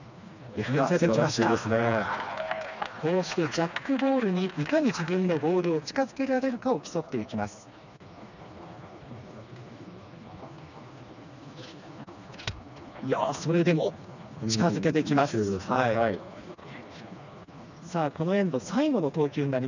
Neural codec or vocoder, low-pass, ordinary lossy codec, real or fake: codec, 16 kHz, 2 kbps, FreqCodec, smaller model; 7.2 kHz; none; fake